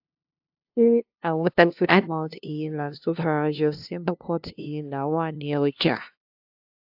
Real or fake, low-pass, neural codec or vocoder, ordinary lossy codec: fake; 5.4 kHz; codec, 16 kHz, 0.5 kbps, FunCodec, trained on LibriTTS, 25 frames a second; AAC, 48 kbps